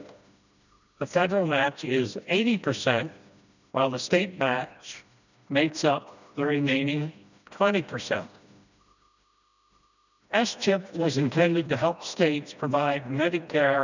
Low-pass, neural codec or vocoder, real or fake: 7.2 kHz; codec, 16 kHz, 1 kbps, FreqCodec, smaller model; fake